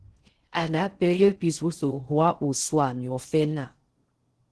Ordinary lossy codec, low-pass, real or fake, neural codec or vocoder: Opus, 16 kbps; 10.8 kHz; fake; codec, 16 kHz in and 24 kHz out, 0.6 kbps, FocalCodec, streaming, 4096 codes